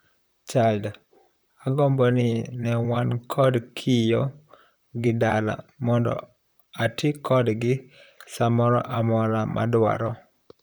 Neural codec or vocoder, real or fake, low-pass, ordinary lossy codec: vocoder, 44.1 kHz, 128 mel bands, Pupu-Vocoder; fake; none; none